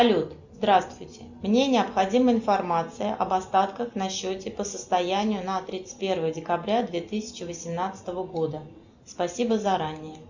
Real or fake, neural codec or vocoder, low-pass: real; none; 7.2 kHz